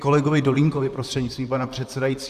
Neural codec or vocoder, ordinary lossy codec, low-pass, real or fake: vocoder, 44.1 kHz, 128 mel bands, Pupu-Vocoder; Opus, 64 kbps; 14.4 kHz; fake